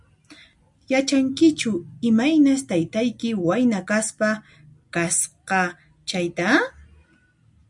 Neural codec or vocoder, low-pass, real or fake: none; 10.8 kHz; real